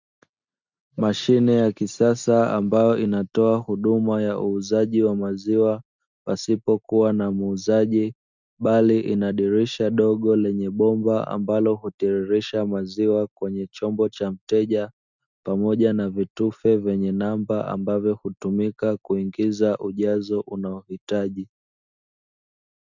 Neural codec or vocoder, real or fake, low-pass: none; real; 7.2 kHz